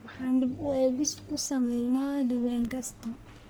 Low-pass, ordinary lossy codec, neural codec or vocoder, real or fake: none; none; codec, 44.1 kHz, 1.7 kbps, Pupu-Codec; fake